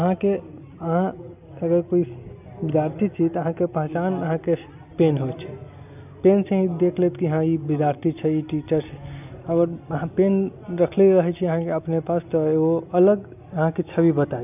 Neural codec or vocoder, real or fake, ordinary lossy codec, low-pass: none; real; none; 3.6 kHz